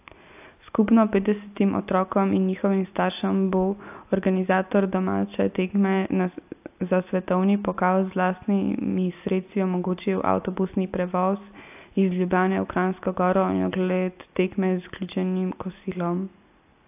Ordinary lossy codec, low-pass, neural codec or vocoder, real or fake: AAC, 32 kbps; 3.6 kHz; none; real